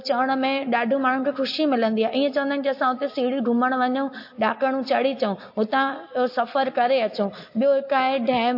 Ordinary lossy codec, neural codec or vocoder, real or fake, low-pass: MP3, 32 kbps; none; real; 5.4 kHz